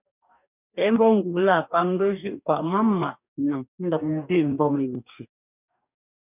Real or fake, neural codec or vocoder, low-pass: fake; codec, 44.1 kHz, 2.6 kbps, DAC; 3.6 kHz